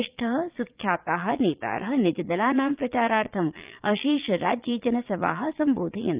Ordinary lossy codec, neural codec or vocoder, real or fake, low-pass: Opus, 24 kbps; vocoder, 22.05 kHz, 80 mel bands, Vocos; fake; 3.6 kHz